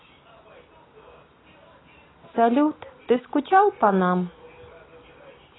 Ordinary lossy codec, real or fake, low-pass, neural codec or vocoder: AAC, 16 kbps; real; 7.2 kHz; none